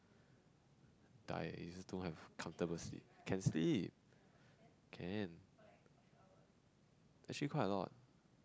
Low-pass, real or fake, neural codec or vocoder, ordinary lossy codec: none; real; none; none